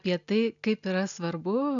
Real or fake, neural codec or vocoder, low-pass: real; none; 7.2 kHz